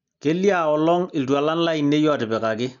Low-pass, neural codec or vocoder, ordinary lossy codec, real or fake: 7.2 kHz; none; MP3, 96 kbps; real